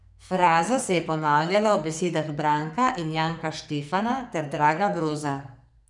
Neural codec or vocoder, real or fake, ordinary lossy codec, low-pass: codec, 44.1 kHz, 2.6 kbps, SNAC; fake; none; 10.8 kHz